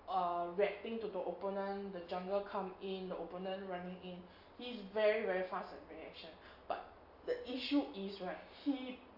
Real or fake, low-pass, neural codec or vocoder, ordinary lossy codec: real; 5.4 kHz; none; AAC, 48 kbps